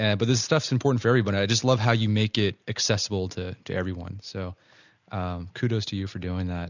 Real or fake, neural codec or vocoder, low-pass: real; none; 7.2 kHz